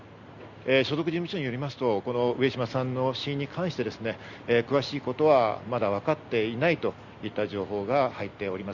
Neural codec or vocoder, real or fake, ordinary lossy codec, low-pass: none; real; Opus, 64 kbps; 7.2 kHz